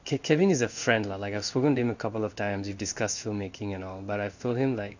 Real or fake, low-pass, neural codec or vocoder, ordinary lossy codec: fake; 7.2 kHz; codec, 16 kHz in and 24 kHz out, 1 kbps, XY-Tokenizer; none